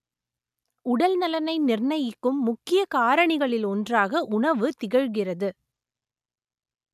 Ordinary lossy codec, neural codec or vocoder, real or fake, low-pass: none; none; real; 14.4 kHz